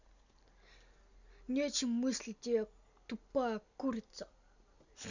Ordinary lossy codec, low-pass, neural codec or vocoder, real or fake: none; 7.2 kHz; none; real